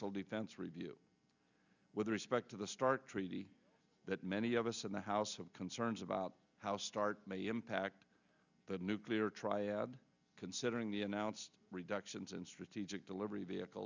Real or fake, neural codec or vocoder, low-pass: real; none; 7.2 kHz